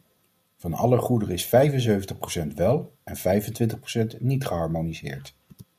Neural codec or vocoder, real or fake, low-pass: none; real; 14.4 kHz